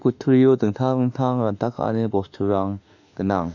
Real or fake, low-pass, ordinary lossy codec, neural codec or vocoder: fake; 7.2 kHz; none; autoencoder, 48 kHz, 32 numbers a frame, DAC-VAE, trained on Japanese speech